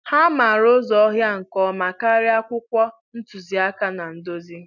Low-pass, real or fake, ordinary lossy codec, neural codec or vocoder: 7.2 kHz; real; none; none